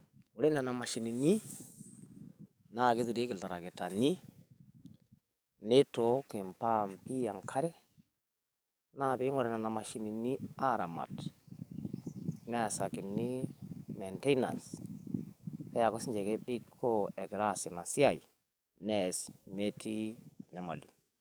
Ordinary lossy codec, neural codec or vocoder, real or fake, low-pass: none; codec, 44.1 kHz, 7.8 kbps, DAC; fake; none